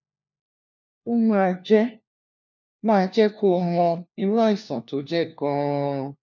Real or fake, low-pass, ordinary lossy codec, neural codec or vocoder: fake; 7.2 kHz; none; codec, 16 kHz, 1 kbps, FunCodec, trained on LibriTTS, 50 frames a second